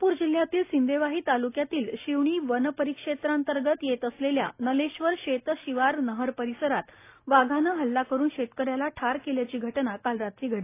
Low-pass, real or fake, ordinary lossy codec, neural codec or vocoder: 3.6 kHz; real; AAC, 24 kbps; none